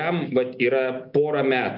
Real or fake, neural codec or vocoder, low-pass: real; none; 5.4 kHz